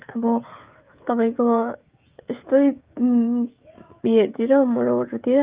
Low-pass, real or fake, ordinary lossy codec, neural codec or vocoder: 3.6 kHz; fake; Opus, 32 kbps; codec, 16 kHz, 16 kbps, FreqCodec, smaller model